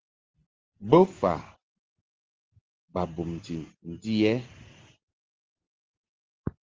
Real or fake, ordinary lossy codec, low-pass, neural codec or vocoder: real; Opus, 16 kbps; 7.2 kHz; none